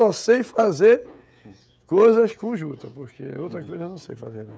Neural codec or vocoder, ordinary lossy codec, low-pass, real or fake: codec, 16 kHz, 16 kbps, FreqCodec, smaller model; none; none; fake